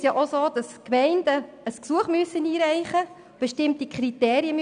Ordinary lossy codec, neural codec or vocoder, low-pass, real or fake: none; none; 9.9 kHz; real